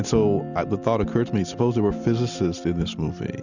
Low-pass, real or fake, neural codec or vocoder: 7.2 kHz; real; none